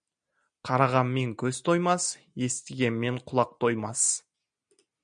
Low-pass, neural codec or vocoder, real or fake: 9.9 kHz; none; real